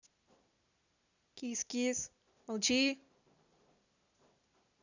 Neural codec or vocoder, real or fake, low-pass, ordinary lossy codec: none; real; 7.2 kHz; none